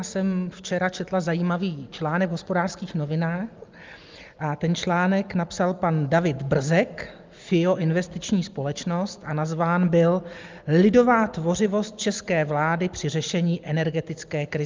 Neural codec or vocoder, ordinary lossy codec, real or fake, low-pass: none; Opus, 24 kbps; real; 7.2 kHz